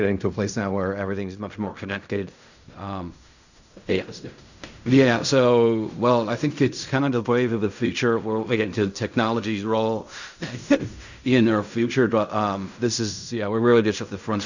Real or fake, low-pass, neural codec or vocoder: fake; 7.2 kHz; codec, 16 kHz in and 24 kHz out, 0.4 kbps, LongCat-Audio-Codec, fine tuned four codebook decoder